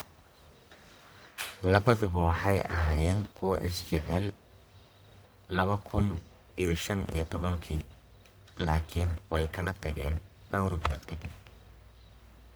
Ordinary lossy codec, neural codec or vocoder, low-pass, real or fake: none; codec, 44.1 kHz, 1.7 kbps, Pupu-Codec; none; fake